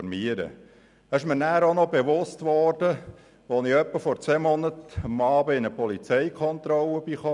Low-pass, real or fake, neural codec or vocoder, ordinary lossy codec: 10.8 kHz; real; none; none